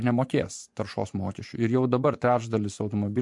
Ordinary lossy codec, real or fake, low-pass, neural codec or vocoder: MP3, 64 kbps; fake; 10.8 kHz; vocoder, 24 kHz, 100 mel bands, Vocos